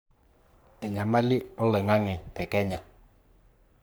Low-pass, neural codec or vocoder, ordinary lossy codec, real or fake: none; codec, 44.1 kHz, 3.4 kbps, Pupu-Codec; none; fake